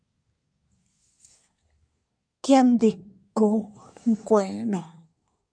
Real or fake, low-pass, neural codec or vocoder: fake; 9.9 kHz; codec, 24 kHz, 1 kbps, SNAC